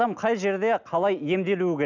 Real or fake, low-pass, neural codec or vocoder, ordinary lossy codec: real; 7.2 kHz; none; none